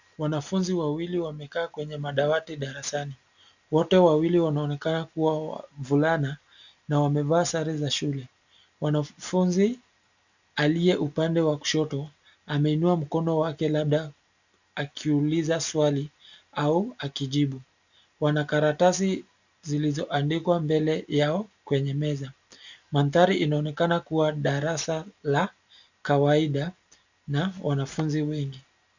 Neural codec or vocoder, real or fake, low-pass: none; real; 7.2 kHz